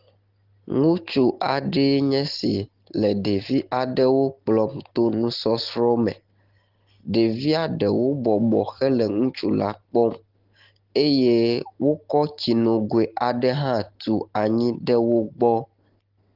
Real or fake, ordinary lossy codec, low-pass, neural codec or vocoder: real; Opus, 16 kbps; 5.4 kHz; none